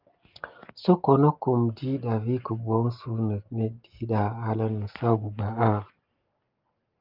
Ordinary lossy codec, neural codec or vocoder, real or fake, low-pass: Opus, 24 kbps; none; real; 5.4 kHz